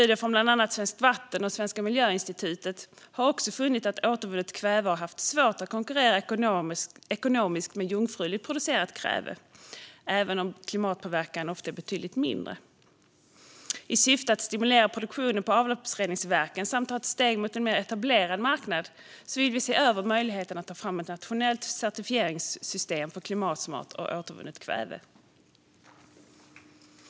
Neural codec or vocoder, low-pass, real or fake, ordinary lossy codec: none; none; real; none